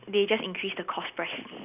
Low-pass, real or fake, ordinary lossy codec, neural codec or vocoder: 3.6 kHz; real; none; none